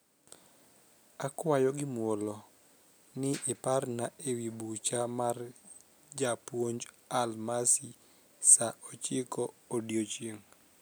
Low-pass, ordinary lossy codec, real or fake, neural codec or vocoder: none; none; real; none